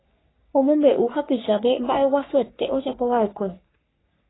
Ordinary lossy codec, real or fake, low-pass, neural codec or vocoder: AAC, 16 kbps; fake; 7.2 kHz; codec, 44.1 kHz, 3.4 kbps, Pupu-Codec